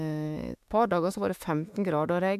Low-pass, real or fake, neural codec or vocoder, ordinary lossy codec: 14.4 kHz; fake; autoencoder, 48 kHz, 32 numbers a frame, DAC-VAE, trained on Japanese speech; AAC, 96 kbps